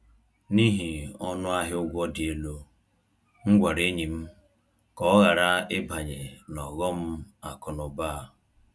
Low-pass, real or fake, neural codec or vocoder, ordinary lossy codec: none; real; none; none